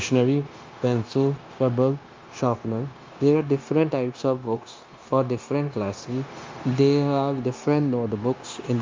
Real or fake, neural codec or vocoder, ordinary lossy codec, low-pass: fake; codec, 16 kHz, 0.9 kbps, LongCat-Audio-Codec; Opus, 24 kbps; 7.2 kHz